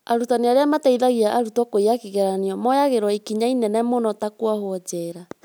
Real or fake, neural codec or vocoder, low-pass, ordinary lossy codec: real; none; none; none